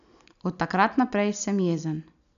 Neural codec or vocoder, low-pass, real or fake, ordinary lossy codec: none; 7.2 kHz; real; none